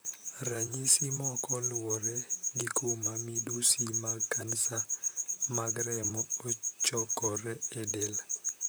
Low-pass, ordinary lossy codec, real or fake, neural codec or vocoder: none; none; fake; vocoder, 44.1 kHz, 128 mel bands, Pupu-Vocoder